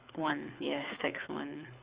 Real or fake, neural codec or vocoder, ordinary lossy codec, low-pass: fake; codec, 24 kHz, 6 kbps, HILCodec; Opus, 64 kbps; 3.6 kHz